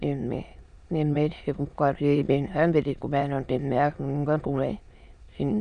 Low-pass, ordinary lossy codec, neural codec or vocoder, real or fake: 9.9 kHz; none; autoencoder, 22.05 kHz, a latent of 192 numbers a frame, VITS, trained on many speakers; fake